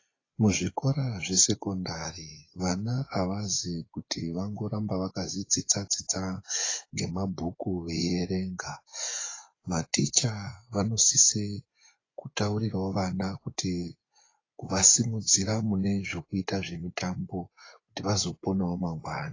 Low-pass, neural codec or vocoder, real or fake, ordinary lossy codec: 7.2 kHz; vocoder, 24 kHz, 100 mel bands, Vocos; fake; AAC, 32 kbps